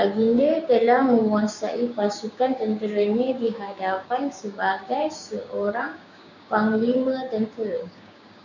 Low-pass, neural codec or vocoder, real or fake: 7.2 kHz; codec, 16 kHz, 6 kbps, DAC; fake